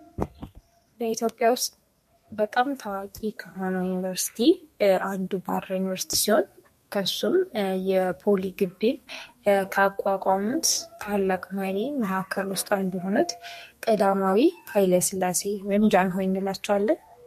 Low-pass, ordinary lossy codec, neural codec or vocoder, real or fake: 14.4 kHz; MP3, 64 kbps; codec, 32 kHz, 1.9 kbps, SNAC; fake